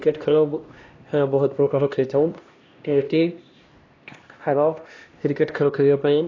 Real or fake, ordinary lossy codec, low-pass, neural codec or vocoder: fake; AAC, 32 kbps; 7.2 kHz; codec, 16 kHz, 1 kbps, X-Codec, HuBERT features, trained on LibriSpeech